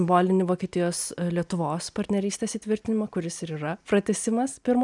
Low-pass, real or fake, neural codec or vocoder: 10.8 kHz; real; none